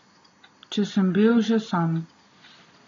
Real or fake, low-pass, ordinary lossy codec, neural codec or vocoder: real; 7.2 kHz; AAC, 32 kbps; none